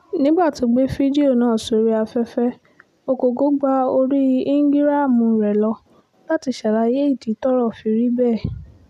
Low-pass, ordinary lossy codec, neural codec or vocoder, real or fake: 14.4 kHz; none; none; real